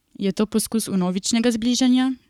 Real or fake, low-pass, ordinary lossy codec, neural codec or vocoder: fake; 19.8 kHz; none; codec, 44.1 kHz, 7.8 kbps, Pupu-Codec